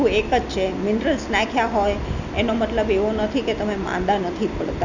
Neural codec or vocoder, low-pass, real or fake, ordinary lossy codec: none; 7.2 kHz; real; none